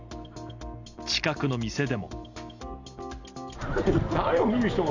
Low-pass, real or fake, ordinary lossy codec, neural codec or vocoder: 7.2 kHz; real; none; none